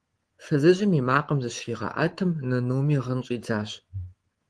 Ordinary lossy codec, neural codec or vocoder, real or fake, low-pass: Opus, 16 kbps; codec, 24 kHz, 3.1 kbps, DualCodec; fake; 10.8 kHz